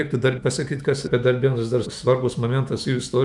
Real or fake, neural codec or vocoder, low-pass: fake; autoencoder, 48 kHz, 128 numbers a frame, DAC-VAE, trained on Japanese speech; 10.8 kHz